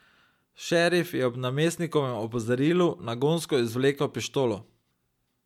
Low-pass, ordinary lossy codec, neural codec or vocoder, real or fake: 19.8 kHz; MP3, 96 kbps; none; real